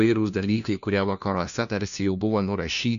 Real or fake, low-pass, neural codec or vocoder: fake; 7.2 kHz; codec, 16 kHz, 1 kbps, FunCodec, trained on LibriTTS, 50 frames a second